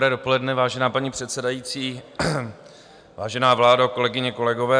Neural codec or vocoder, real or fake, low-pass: none; real; 9.9 kHz